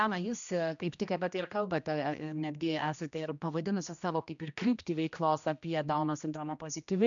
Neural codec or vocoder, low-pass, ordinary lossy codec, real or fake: codec, 16 kHz, 1 kbps, X-Codec, HuBERT features, trained on general audio; 7.2 kHz; MP3, 96 kbps; fake